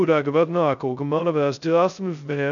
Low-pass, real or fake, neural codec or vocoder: 7.2 kHz; fake; codec, 16 kHz, 0.2 kbps, FocalCodec